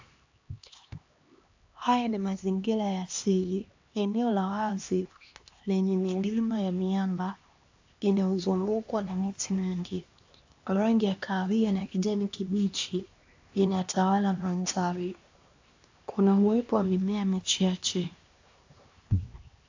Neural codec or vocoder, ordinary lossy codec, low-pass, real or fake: codec, 16 kHz, 2 kbps, X-Codec, HuBERT features, trained on LibriSpeech; AAC, 48 kbps; 7.2 kHz; fake